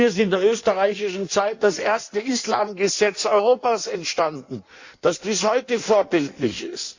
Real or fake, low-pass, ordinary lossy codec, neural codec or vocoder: fake; 7.2 kHz; Opus, 64 kbps; codec, 16 kHz in and 24 kHz out, 1.1 kbps, FireRedTTS-2 codec